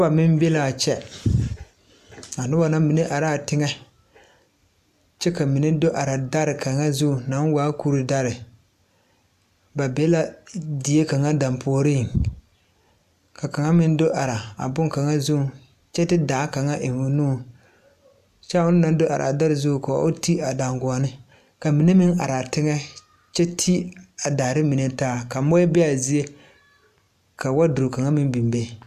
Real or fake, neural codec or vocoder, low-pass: real; none; 14.4 kHz